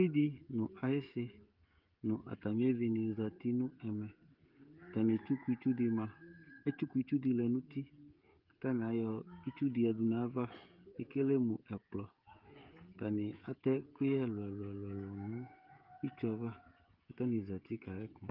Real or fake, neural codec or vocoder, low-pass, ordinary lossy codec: fake; codec, 16 kHz, 16 kbps, FreqCodec, smaller model; 5.4 kHz; Opus, 32 kbps